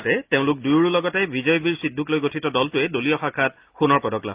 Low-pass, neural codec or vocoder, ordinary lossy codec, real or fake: 3.6 kHz; none; Opus, 32 kbps; real